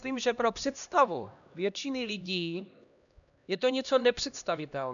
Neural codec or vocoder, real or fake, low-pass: codec, 16 kHz, 1 kbps, X-Codec, HuBERT features, trained on LibriSpeech; fake; 7.2 kHz